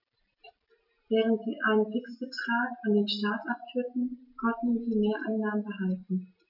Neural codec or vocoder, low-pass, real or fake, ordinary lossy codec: none; 5.4 kHz; real; none